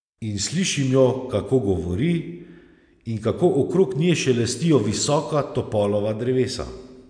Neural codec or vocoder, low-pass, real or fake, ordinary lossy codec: none; 9.9 kHz; real; MP3, 96 kbps